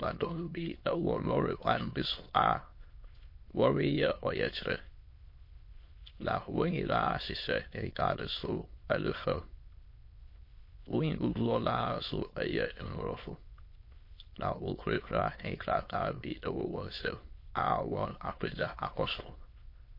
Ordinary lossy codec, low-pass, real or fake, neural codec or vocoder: MP3, 32 kbps; 5.4 kHz; fake; autoencoder, 22.05 kHz, a latent of 192 numbers a frame, VITS, trained on many speakers